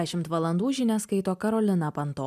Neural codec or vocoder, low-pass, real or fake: none; 14.4 kHz; real